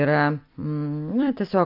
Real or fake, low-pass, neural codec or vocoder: fake; 5.4 kHz; vocoder, 44.1 kHz, 128 mel bands every 256 samples, BigVGAN v2